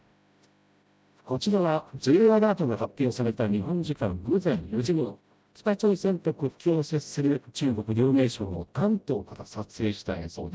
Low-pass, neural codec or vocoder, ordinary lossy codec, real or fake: none; codec, 16 kHz, 0.5 kbps, FreqCodec, smaller model; none; fake